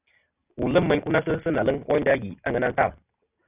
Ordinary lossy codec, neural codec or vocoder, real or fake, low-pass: Opus, 64 kbps; none; real; 3.6 kHz